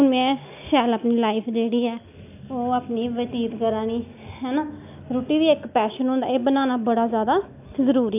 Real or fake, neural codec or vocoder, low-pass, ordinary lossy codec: real; none; 3.6 kHz; AAC, 32 kbps